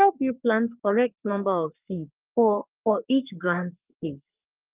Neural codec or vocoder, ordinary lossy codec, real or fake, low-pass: codec, 44.1 kHz, 3.4 kbps, Pupu-Codec; Opus, 24 kbps; fake; 3.6 kHz